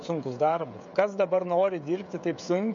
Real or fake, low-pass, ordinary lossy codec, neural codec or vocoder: fake; 7.2 kHz; MP3, 48 kbps; codec, 16 kHz, 16 kbps, FreqCodec, smaller model